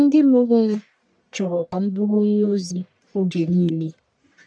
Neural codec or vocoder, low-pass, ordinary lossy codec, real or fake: codec, 44.1 kHz, 1.7 kbps, Pupu-Codec; 9.9 kHz; MP3, 96 kbps; fake